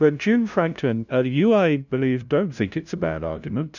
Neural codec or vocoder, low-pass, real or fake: codec, 16 kHz, 0.5 kbps, FunCodec, trained on LibriTTS, 25 frames a second; 7.2 kHz; fake